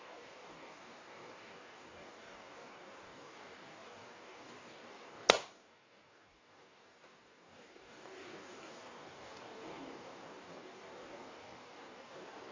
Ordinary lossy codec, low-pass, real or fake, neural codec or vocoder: AAC, 32 kbps; 7.2 kHz; fake; codec, 44.1 kHz, 2.6 kbps, DAC